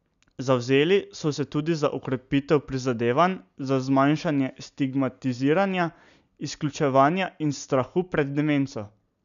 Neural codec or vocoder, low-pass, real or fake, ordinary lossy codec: none; 7.2 kHz; real; none